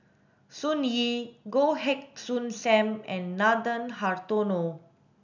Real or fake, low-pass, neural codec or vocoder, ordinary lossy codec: real; 7.2 kHz; none; none